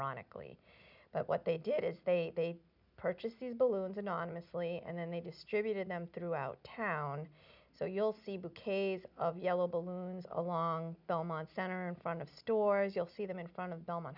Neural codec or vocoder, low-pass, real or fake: none; 5.4 kHz; real